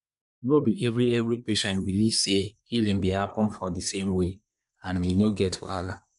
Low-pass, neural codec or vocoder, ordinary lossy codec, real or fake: 10.8 kHz; codec, 24 kHz, 1 kbps, SNAC; none; fake